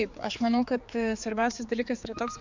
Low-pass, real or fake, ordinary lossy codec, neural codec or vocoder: 7.2 kHz; fake; MP3, 64 kbps; codec, 16 kHz, 4 kbps, X-Codec, HuBERT features, trained on general audio